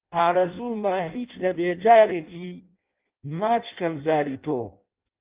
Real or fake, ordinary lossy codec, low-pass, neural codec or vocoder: fake; Opus, 32 kbps; 3.6 kHz; codec, 16 kHz in and 24 kHz out, 0.6 kbps, FireRedTTS-2 codec